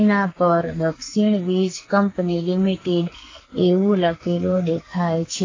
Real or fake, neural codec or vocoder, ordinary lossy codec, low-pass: fake; codec, 44.1 kHz, 2.6 kbps, SNAC; AAC, 32 kbps; 7.2 kHz